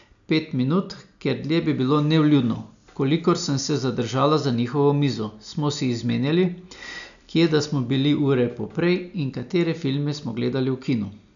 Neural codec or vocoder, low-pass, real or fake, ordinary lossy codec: none; 7.2 kHz; real; none